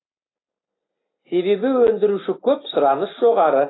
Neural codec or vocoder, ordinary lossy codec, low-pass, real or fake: none; AAC, 16 kbps; 7.2 kHz; real